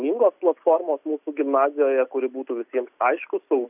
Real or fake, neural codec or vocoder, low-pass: real; none; 3.6 kHz